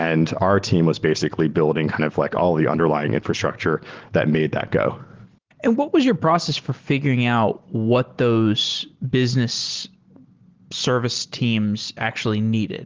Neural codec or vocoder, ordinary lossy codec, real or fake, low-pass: none; Opus, 16 kbps; real; 7.2 kHz